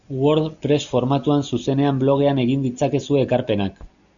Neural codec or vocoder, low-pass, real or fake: none; 7.2 kHz; real